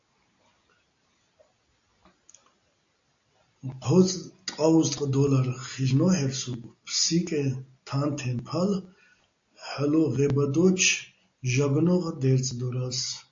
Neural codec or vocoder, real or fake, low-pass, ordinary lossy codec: none; real; 7.2 kHz; AAC, 48 kbps